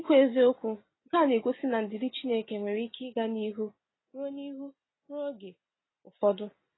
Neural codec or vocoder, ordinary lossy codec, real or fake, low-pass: none; AAC, 16 kbps; real; 7.2 kHz